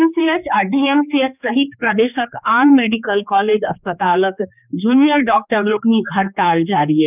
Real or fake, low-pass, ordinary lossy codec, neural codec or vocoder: fake; 3.6 kHz; none; codec, 16 kHz, 2 kbps, X-Codec, HuBERT features, trained on general audio